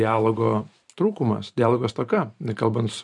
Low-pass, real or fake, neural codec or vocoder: 10.8 kHz; fake; vocoder, 44.1 kHz, 128 mel bands every 512 samples, BigVGAN v2